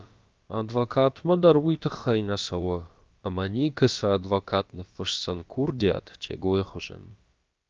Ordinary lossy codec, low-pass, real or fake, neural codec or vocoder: Opus, 24 kbps; 7.2 kHz; fake; codec, 16 kHz, about 1 kbps, DyCAST, with the encoder's durations